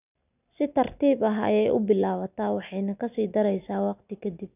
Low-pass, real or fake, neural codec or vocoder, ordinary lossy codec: 3.6 kHz; real; none; none